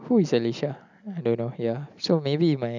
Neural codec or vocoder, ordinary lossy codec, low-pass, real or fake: none; none; 7.2 kHz; real